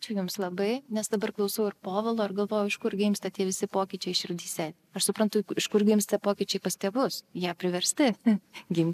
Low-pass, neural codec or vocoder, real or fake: 14.4 kHz; none; real